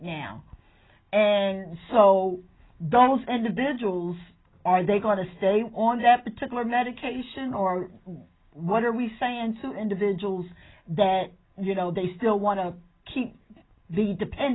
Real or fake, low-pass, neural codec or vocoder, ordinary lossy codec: real; 7.2 kHz; none; AAC, 16 kbps